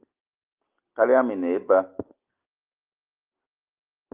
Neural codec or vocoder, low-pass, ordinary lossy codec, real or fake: none; 3.6 kHz; Opus, 32 kbps; real